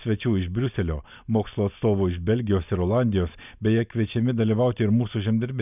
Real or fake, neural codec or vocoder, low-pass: real; none; 3.6 kHz